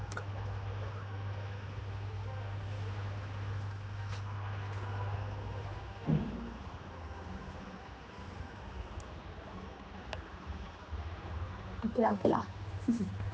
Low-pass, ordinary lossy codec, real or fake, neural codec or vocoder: none; none; fake; codec, 16 kHz, 2 kbps, X-Codec, HuBERT features, trained on general audio